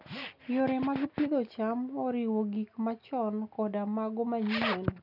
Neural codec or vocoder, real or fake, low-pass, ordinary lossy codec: none; real; 5.4 kHz; none